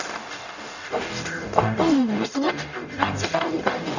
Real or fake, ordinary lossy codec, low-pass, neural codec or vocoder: fake; none; 7.2 kHz; codec, 44.1 kHz, 0.9 kbps, DAC